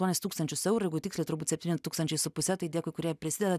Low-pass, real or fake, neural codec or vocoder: 14.4 kHz; real; none